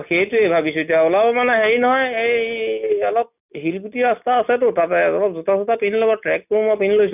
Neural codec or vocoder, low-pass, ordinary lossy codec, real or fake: none; 3.6 kHz; none; real